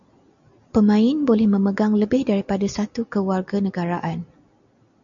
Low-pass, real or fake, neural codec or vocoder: 7.2 kHz; real; none